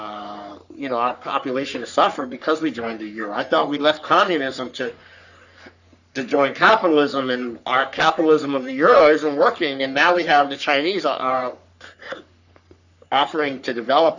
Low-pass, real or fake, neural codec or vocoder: 7.2 kHz; fake; codec, 44.1 kHz, 3.4 kbps, Pupu-Codec